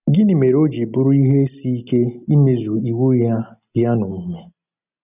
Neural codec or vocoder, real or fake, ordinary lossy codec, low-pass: none; real; none; 3.6 kHz